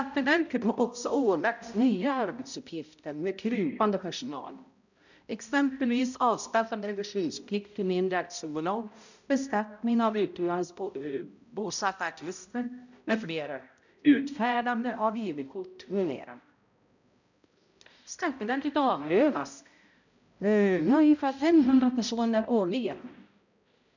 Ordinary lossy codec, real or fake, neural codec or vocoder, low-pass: none; fake; codec, 16 kHz, 0.5 kbps, X-Codec, HuBERT features, trained on balanced general audio; 7.2 kHz